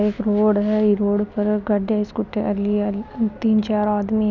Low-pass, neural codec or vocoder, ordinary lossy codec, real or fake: 7.2 kHz; none; none; real